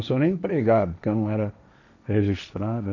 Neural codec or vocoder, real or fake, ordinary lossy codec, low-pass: codec, 16 kHz, 1.1 kbps, Voila-Tokenizer; fake; none; 7.2 kHz